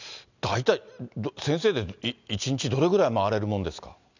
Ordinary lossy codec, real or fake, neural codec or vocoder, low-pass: none; real; none; 7.2 kHz